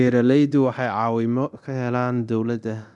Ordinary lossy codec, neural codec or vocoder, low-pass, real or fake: none; codec, 24 kHz, 0.9 kbps, DualCodec; 10.8 kHz; fake